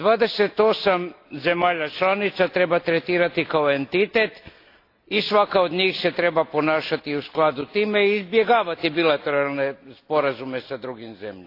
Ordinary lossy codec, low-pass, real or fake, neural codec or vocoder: AAC, 32 kbps; 5.4 kHz; real; none